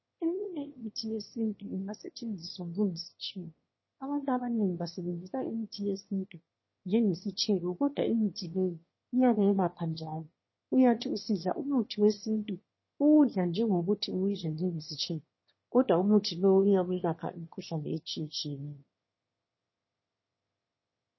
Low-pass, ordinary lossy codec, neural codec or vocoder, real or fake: 7.2 kHz; MP3, 24 kbps; autoencoder, 22.05 kHz, a latent of 192 numbers a frame, VITS, trained on one speaker; fake